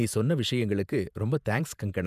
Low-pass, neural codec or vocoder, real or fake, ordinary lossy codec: 14.4 kHz; vocoder, 48 kHz, 128 mel bands, Vocos; fake; none